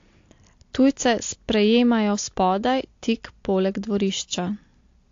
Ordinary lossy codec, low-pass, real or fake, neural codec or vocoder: AAC, 48 kbps; 7.2 kHz; real; none